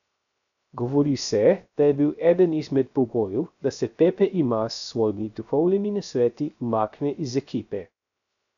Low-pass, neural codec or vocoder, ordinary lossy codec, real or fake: 7.2 kHz; codec, 16 kHz, 0.3 kbps, FocalCodec; none; fake